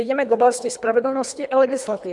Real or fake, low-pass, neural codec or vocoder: fake; 10.8 kHz; codec, 24 kHz, 3 kbps, HILCodec